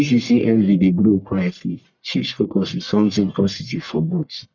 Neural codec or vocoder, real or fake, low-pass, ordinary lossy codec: codec, 44.1 kHz, 1.7 kbps, Pupu-Codec; fake; 7.2 kHz; none